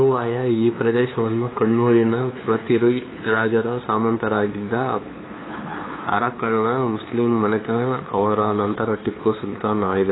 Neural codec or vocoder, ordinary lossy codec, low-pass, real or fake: codec, 16 kHz, 2 kbps, FunCodec, trained on LibriTTS, 25 frames a second; AAC, 16 kbps; 7.2 kHz; fake